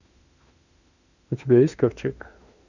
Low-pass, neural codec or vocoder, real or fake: 7.2 kHz; autoencoder, 48 kHz, 32 numbers a frame, DAC-VAE, trained on Japanese speech; fake